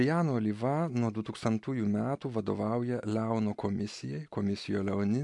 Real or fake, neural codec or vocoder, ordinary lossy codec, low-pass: real; none; MP3, 64 kbps; 10.8 kHz